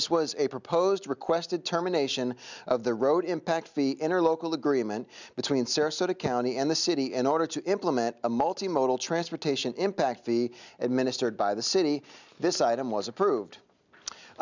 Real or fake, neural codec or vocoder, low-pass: real; none; 7.2 kHz